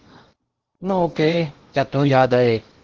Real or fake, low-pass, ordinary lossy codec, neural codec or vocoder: fake; 7.2 kHz; Opus, 16 kbps; codec, 16 kHz in and 24 kHz out, 0.8 kbps, FocalCodec, streaming, 65536 codes